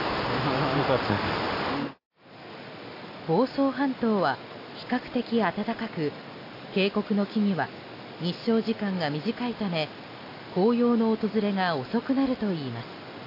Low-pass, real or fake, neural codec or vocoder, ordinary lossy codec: 5.4 kHz; real; none; AAC, 32 kbps